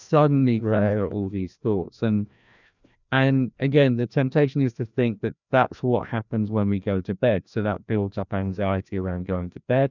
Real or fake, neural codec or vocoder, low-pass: fake; codec, 16 kHz, 1 kbps, FreqCodec, larger model; 7.2 kHz